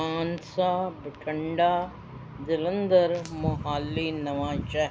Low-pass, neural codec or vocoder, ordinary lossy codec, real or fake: none; none; none; real